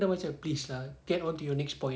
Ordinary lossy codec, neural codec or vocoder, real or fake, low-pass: none; none; real; none